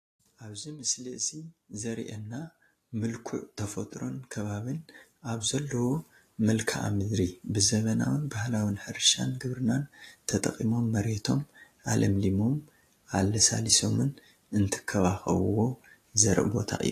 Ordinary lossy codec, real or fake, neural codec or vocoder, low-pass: AAC, 48 kbps; real; none; 14.4 kHz